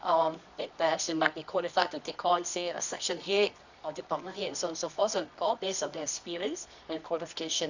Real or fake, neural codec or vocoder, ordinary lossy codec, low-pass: fake; codec, 24 kHz, 0.9 kbps, WavTokenizer, medium music audio release; none; 7.2 kHz